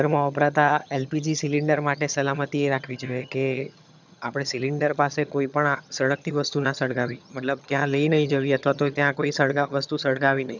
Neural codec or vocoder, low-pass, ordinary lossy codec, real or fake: vocoder, 22.05 kHz, 80 mel bands, HiFi-GAN; 7.2 kHz; none; fake